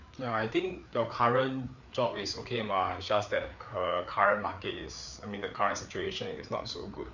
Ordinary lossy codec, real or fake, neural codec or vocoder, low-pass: none; fake; codec, 16 kHz, 4 kbps, FreqCodec, larger model; 7.2 kHz